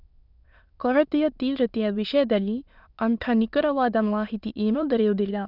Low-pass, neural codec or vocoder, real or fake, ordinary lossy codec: 5.4 kHz; autoencoder, 22.05 kHz, a latent of 192 numbers a frame, VITS, trained on many speakers; fake; none